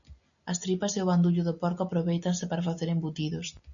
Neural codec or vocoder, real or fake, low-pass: none; real; 7.2 kHz